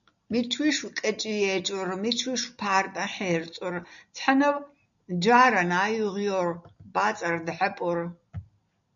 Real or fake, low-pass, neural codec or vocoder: real; 7.2 kHz; none